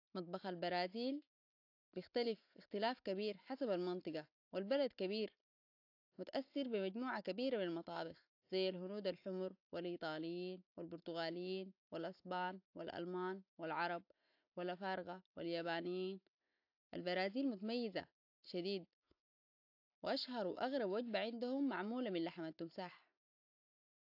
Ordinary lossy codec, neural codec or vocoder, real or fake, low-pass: AAC, 48 kbps; none; real; 5.4 kHz